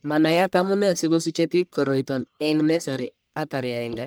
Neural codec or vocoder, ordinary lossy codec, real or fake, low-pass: codec, 44.1 kHz, 1.7 kbps, Pupu-Codec; none; fake; none